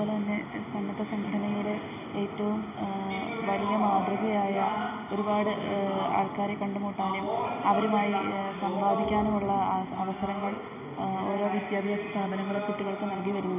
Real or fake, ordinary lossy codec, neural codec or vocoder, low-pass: real; none; none; 3.6 kHz